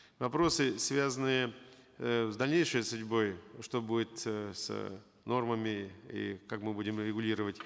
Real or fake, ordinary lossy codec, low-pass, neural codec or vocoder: real; none; none; none